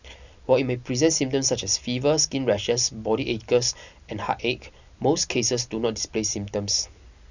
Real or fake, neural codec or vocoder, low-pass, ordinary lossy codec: real; none; 7.2 kHz; none